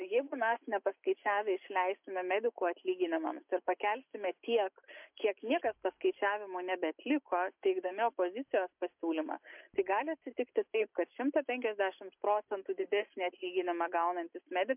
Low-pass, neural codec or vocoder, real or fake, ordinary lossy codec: 3.6 kHz; none; real; MP3, 32 kbps